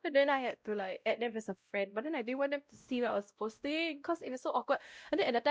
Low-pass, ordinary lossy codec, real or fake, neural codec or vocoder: none; none; fake; codec, 16 kHz, 0.5 kbps, X-Codec, WavLM features, trained on Multilingual LibriSpeech